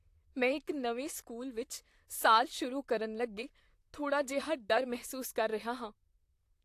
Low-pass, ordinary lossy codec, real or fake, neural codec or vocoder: 14.4 kHz; AAC, 64 kbps; fake; vocoder, 44.1 kHz, 128 mel bands, Pupu-Vocoder